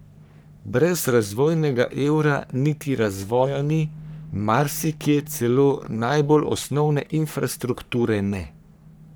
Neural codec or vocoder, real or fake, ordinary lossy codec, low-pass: codec, 44.1 kHz, 3.4 kbps, Pupu-Codec; fake; none; none